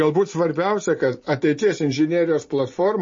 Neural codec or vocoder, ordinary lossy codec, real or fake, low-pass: none; MP3, 32 kbps; real; 7.2 kHz